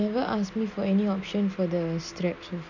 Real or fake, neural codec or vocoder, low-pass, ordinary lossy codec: real; none; 7.2 kHz; none